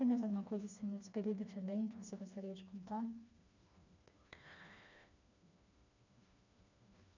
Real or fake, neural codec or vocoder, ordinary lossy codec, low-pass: fake; codec, 16 kHz, 2 kbps, FreqCodec, smaller model; none; 7.2 kHz